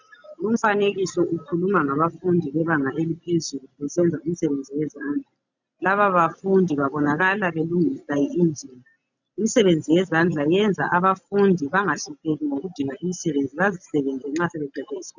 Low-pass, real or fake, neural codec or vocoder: 7.2 kHz; real; none